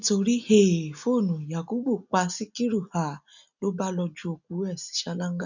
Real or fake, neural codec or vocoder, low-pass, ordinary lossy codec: real; none; 7.2 kHz; none